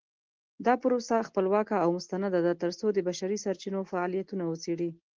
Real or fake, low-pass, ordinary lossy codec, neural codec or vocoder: real; 7.2 kHz; Opus, 16 kbps; none